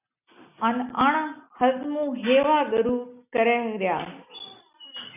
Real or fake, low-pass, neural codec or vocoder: real; 3.6 kHz; none